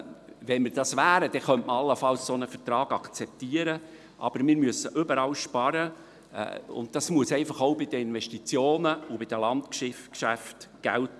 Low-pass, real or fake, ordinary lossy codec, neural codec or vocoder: none; real; none; none